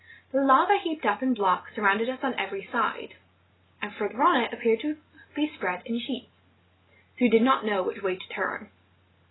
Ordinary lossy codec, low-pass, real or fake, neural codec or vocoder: AAC, 16 kbps; 7.2 kHz; real; none